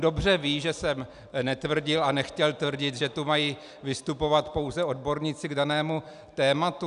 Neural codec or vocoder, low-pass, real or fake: none; 10.8 kHz; real